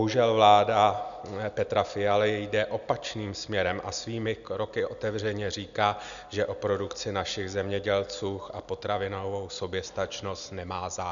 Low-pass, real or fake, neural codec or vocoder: 7.2 kHz; real; none